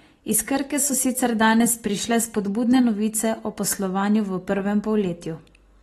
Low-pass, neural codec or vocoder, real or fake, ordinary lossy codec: 19.8 kHz; none; real; AAC, 32 kbps